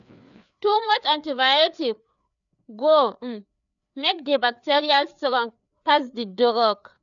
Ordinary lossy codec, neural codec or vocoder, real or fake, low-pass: none; codec, 16 kHz, 4 kbps, FreqCodec, larger model; fake; 7.2 kHz